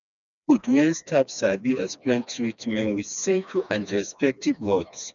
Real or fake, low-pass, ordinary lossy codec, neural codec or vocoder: fake; 7.2 kHz; none; codec, 16 kHz, 2 kbps, FreqCodec, smaller model